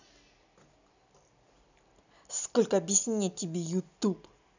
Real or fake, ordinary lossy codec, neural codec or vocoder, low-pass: real; none; none; 7.2 kHz